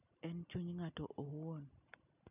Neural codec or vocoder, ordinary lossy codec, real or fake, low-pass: none; none; real; 3.6 kHz